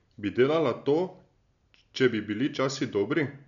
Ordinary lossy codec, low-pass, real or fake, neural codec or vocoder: MP3, 96 kbps; 7.2 kHz; real; none